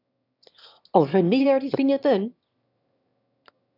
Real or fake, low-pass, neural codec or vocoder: fake; 5.4 kHz; autoencoder, 22.05 kHz, a latent of 192 numbers a frame, VITS, trained on one speaker